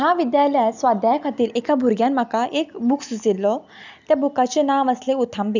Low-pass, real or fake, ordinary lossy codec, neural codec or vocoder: 7.2 kHz; real; none; none